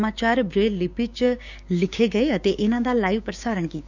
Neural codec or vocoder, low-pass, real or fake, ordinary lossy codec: codec, 16 kHz, 6 kbps, DAC; 7.2 kHz; fake; none